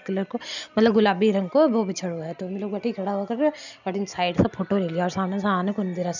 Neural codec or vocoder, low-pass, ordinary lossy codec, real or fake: none; 7.2 kHz; none; real